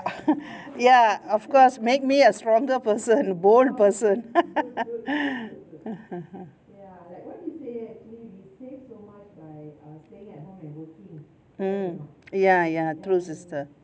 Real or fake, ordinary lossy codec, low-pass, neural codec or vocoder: real; none; none; none